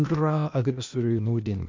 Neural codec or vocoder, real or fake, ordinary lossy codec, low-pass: codec, 16 kHz, 0.8 kbps, ZipCodec; fake; MP3, 64 kbps; 7.2 kHz